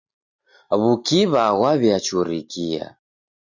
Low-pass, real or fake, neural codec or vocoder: 7.2 kHz; real; none